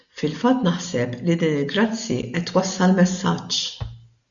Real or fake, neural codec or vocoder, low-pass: real; none; 7.2 kHz